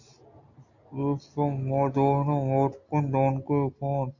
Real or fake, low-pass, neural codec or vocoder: real; 7.2 kHz; none